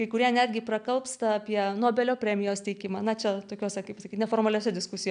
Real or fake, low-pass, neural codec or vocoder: real; 9.9 kHz; none